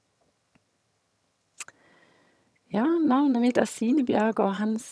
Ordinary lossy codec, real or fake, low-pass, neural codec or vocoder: none; fake; none; vocoder, 22.05 kHz, 80 mel bands, HiFi-GAN